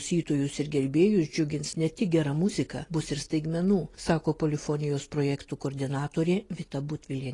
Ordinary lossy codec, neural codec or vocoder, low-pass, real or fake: AAC, 32 kbps; none; 10.8 kHz; real